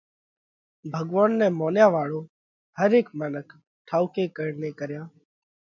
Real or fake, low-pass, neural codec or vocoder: real; 7.2 kHz; none